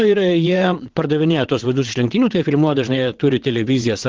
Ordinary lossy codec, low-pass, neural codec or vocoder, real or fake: Opus, 16 kbps; 7.2 kHz; vocoder, 22.05 kHz, 80 mel bands, WaveNeXt; fake